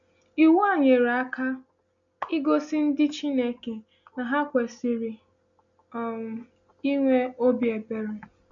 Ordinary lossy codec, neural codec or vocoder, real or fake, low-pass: none; none; real; 7.2 kHz